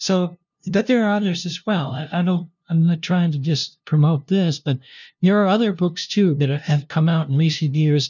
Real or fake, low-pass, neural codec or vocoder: fake; 7.2 kHz; codec, 16 kHz, 0.5 kbps, FunCodec, trained on LibriTTS, 25 frames a second